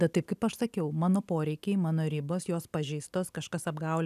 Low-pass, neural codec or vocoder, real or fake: 14.4 kHz; none; real